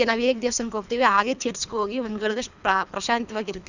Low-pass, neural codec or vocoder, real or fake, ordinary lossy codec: 7.2 kHz; codec, 24 kHz, 3 kbps, HILCodec; fake; none